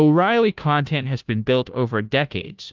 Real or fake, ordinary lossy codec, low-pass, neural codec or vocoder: fake; Opus, 24 kbps; 7.2 kHz; codec, 16 kHz, 0.5 kbps, FunCodec, trained on Chinese and English, 25 frames a second